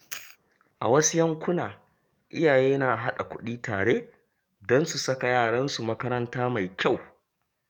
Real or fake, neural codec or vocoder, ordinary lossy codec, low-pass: fake; codec, 44.1 kHz, 7.8 kbps, DAC; none; 19.8 kHz